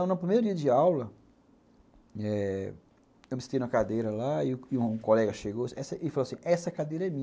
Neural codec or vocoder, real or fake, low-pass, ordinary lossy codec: none; real; none; none